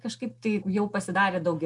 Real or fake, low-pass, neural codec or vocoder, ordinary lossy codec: real; 10.8 kHz; none; MP3, 96 kbps